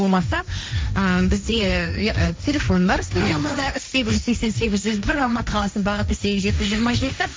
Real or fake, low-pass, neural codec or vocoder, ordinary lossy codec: fake; none; codec, 16 kHz, 1.1 kbps, Voila-Tokenizer; none